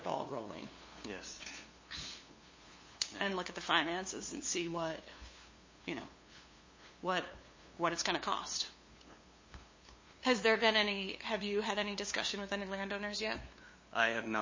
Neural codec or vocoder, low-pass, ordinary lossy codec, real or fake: codec, 16 kHz, 2 kbps, FunCodec, trained on LibriTTS, 25 frames a second; 7.2 kHz; MP3, 32 kbps; fake